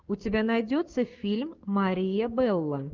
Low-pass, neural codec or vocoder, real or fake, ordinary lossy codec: 7.2 kHz; codec, 16 kHz, 6 kbps, DAC; fake; Opus, 32 kbps